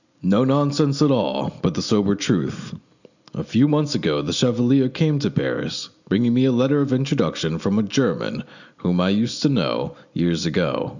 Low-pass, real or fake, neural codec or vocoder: 7.2 kHz; real; none